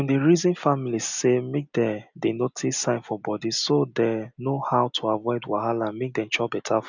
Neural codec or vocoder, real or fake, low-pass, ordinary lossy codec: none; real; 7.2 kHz; none